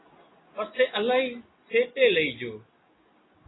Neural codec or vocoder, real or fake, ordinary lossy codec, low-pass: none; real; AAC, 16 kbps; 7.2 kHz